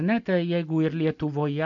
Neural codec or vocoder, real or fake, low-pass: none; real; 7.2 kHz